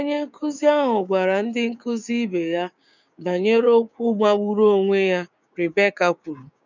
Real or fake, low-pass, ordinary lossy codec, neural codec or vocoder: fake; 7.2 kHz; none; codec, 16 kHz, 6 kbps, DAC